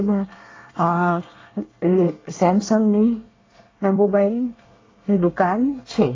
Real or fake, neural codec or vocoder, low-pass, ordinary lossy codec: fake; codec, 24 kHz, 1 kbps, SNAC; 7.2 kHz; AAC, 32 kbps